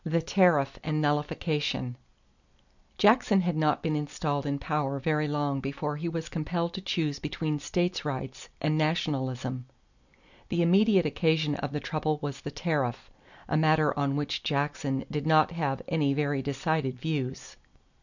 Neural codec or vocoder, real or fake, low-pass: none; real; 7.2 kHz